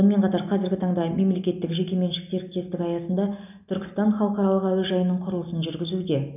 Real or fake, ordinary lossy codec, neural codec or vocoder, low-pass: real; none; none; 3.6 kHz